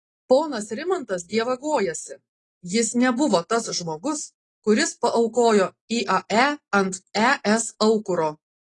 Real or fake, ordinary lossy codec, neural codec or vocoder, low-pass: real; AAC, 32 kbps; none; 10.8 kHz